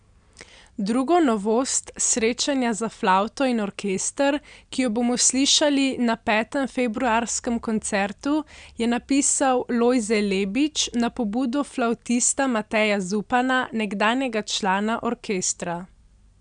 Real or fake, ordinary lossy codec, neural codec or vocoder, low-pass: real; none; none; 9.9 kHz